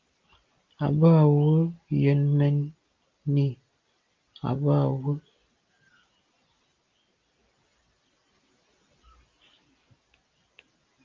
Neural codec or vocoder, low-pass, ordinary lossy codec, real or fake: none; 7.2 kHz; Opus, 32 kbps; real